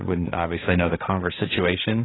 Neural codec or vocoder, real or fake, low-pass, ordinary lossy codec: codec, 16 kHz, 1.1 kbps, Voila-Tokenizer; fake; 7.2 kHz; AAC, 16 kbps